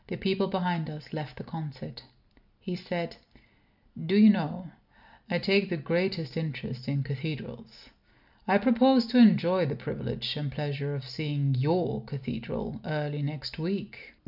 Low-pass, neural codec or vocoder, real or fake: 5.4 kHz; none; real